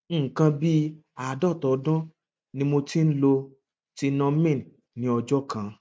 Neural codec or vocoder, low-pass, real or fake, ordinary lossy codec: none; none; real; none